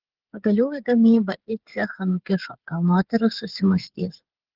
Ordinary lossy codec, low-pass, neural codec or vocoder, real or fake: Opus, 16 kbps; 5.4 kHz; codec, 16 kHz, 8 kbps, FreqCodec, smaller model; fake